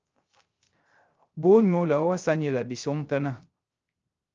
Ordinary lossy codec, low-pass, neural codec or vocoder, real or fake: Opus, 24 kbps; 7.2 kHz; codec, 16 kHz, 0.3 kbps, FocalCodec; fake